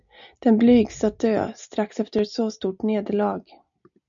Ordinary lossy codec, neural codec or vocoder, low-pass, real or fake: MP3, 96 kbps; none; 7.2 kHz; real